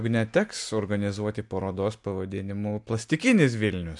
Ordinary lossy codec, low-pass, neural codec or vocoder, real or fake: MP3, 96 kbps; 10.8 kHz; none; real